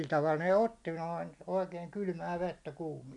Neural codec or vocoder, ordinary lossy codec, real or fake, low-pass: none; none; real; 10.8 kHz